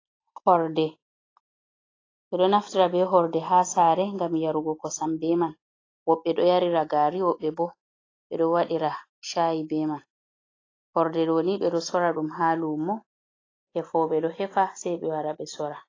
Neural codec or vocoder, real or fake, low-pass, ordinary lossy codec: none; real; 7.2 kHz; AAC, 32 kbps